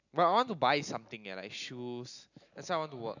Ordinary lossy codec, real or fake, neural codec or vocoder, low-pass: none; real; none; 7.2 kHz